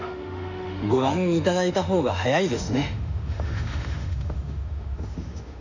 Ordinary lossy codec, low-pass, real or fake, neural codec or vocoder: none; 7.2 kHz; fake; autoencoder, 48 kHz, 32 numbers a frame, DAC-VAE, trained on Japanese speech